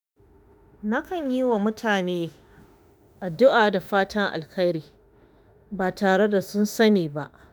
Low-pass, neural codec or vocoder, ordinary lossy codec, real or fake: none; autoencoder, 48 kHz, 32 numbers a frame, DAC-VAE, trained on Japanese speech; none; fake